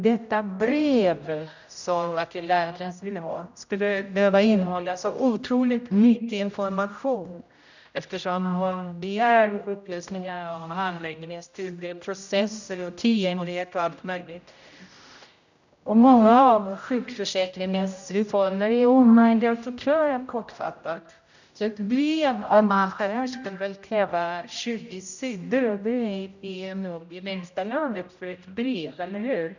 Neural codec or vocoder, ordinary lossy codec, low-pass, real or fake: codec, 16 kHz, 0.5 kbps, X-Codec, HuBERT features, trained on general audio; none; 7.2 kHz; fake